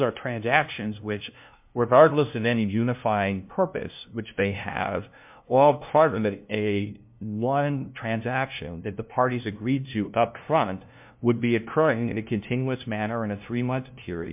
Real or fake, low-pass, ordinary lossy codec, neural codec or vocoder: fake; 3.6 kHz; MP3, 32 kbps; codec, 16 kHz, 0.5 kbps, FunCodec, trained on LibriTTS, 25 frames a second